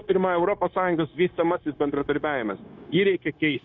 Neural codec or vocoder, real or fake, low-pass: codec, 16 kHz, 0.9 kbps, LongCat-Audio-Codec; fake; 7.2 kHz